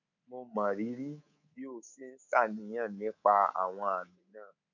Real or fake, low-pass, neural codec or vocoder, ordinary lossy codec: fake; 7.2 kHz; codec, 24 kHz, 3.1 kbps, DualCodec; none